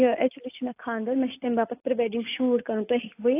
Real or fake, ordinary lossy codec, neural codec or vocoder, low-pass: real; AAC, 24 kbps; none; 3.6 kHz